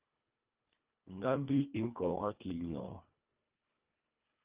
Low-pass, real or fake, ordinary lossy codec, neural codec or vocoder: 3.6 kHz; fake; Opus, 24 kbps; codec, 24 kHz, 1.5 kbps, HILCodec